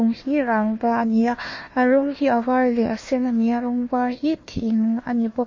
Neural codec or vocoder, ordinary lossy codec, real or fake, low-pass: codec, 16 kHz, 1 kbps, FunCodec, trained on Chinese and English, 50 frames a second; MP3, 32 kbps; fake; 7.2 kHz